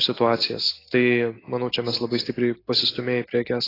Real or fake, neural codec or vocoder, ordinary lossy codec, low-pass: real; none; AAC, 24 kbps; 5.4 kHz